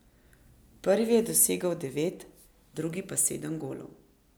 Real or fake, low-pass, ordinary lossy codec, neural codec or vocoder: real; none; none; none